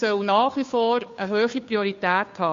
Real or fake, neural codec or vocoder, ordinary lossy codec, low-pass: fake; codec, 16 kHz, 2 kbps, FunCodec, trained on Chinese and English, 25 frames a second; MP3, 48 kbps; 7.2 kHz